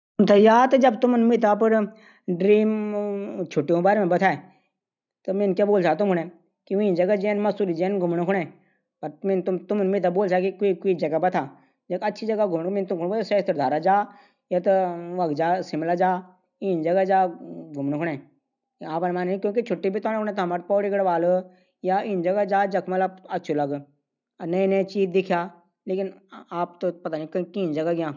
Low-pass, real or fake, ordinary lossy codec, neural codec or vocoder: 7.2 kHz; real; none; none